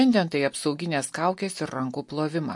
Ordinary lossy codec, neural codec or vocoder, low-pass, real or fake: MP3, 48 kbps; none; 10.8 kHz; real